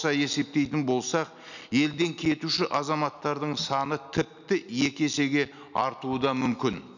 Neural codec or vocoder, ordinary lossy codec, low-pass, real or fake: none; none; 7.2 kHz; real